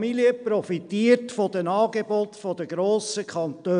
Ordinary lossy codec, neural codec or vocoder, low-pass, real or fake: none; none; 9.9 kHz; real